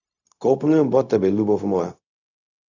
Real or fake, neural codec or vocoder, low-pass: fake; codec, 16 kHz, 0.4 kbps, LongCat-Audio-Codec; 7.2 kHz